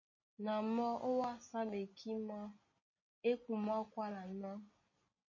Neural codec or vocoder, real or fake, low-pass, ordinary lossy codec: none; real; 7.2 kHz; MP3, 32 kbps